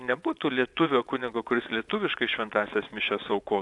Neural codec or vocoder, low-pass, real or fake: vocoder, 24 kHz, 100 mel bands, Vocos; 10.8 kHz; fake